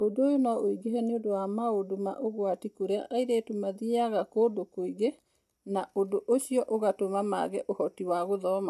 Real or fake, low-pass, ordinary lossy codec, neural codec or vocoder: real; 14.4 kHz; AAC, 96 kbps; none